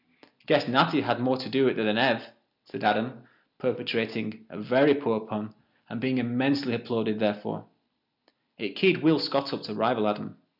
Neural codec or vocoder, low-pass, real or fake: none; 5.4 kHz; real